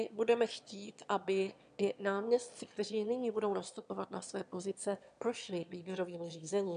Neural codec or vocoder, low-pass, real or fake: autoencoder, 22.05 kHz, a latent of 192 numbers a frame, VITS, trained on one speaker; 9.9 kHz; fake